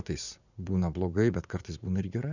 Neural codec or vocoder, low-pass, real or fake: vocoder, 44.1 kHz, 80 mel bands, Vocos; 7.2 kHz; fake